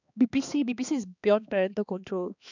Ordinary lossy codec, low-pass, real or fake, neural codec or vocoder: none; 7.2 kHz; fake; codec, 16 kHz, 2 kbps, X-Codec, HuBERT features, trained on balanced general audio